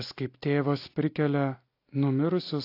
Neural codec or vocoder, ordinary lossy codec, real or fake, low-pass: none; AAC, 32 kbps; real; 5.4 kHz